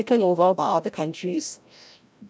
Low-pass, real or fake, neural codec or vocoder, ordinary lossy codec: none; fake; codec, 16 kHz, 0.5 kbps, FreqCodec, larger model; none